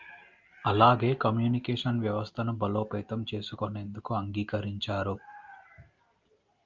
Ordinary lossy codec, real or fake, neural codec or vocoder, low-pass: Opus, 24 kbps; real; none; 7.2 kHz